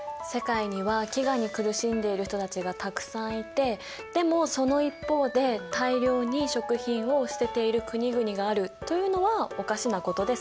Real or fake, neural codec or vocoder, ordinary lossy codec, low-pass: real; none; none; none